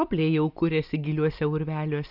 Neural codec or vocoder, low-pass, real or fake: none; 5.4 kHz; real